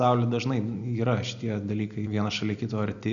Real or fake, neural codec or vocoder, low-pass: real; none; 7.2 kHz